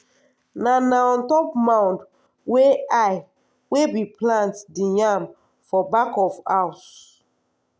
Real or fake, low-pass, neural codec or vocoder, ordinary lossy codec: real; none; none; none